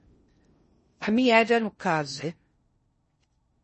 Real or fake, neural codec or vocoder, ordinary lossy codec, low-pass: fake; codec, 16 kHz in and 24 kHz out, 0.6 kbps, FocalCodec, streaming, 2048 codes; MP3, 32 kbps; 10.8 kHz